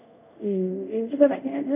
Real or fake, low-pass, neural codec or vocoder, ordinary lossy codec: fake; 3.6 kHz; codec, 24 kHz, 0.5 kbps, DualCodec; none